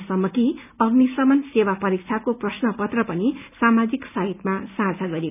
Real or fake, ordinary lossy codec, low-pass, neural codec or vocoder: real; none; 3.6 kHz; none